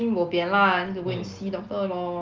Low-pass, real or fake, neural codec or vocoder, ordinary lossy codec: 7.2 kHz; real; none; Opus, 32 kbps